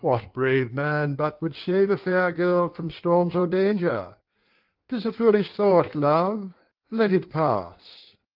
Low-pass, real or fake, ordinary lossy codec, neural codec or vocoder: 5.4 kHz; fake; Opus, 32 kbps; codec, 16 kHz in and 24 kHz out, 1.1 kbps, FireRedTTS-2 codec